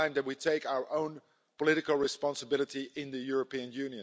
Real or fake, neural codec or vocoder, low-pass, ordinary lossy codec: real; none; none; none